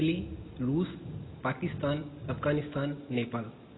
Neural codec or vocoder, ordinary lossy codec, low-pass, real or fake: none; AAC, 16 kbps; 7.2 kHz; real